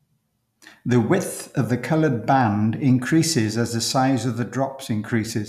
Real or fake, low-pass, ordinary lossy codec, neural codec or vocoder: real; 14.4 kHz; none; none